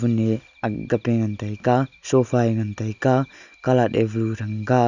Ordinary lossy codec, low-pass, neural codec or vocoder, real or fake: none; 7.2 kHz; none; real